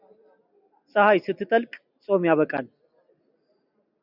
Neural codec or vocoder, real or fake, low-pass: none; real; 5.4 kHz